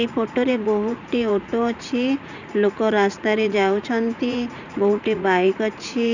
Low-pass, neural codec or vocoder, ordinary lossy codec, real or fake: 7.2 kHz; vocoder, 44.1 kHz, 80 mel bands, Vocos; none; fake